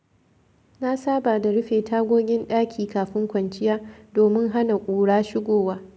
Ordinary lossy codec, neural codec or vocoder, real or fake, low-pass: none; none; real; none